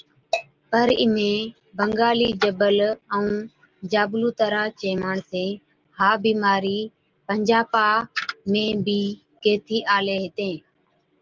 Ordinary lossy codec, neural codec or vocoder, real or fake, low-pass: Opus, 32 kbps; none; real; 7.2 kHz